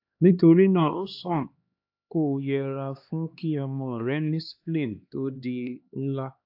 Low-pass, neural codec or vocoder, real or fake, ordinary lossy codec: 5.4 kHz; codec, 16 kHz, 2 kbps, X-Codec, HuBERT features, trained on LibriSpeech; fake; AAC, 48 kbps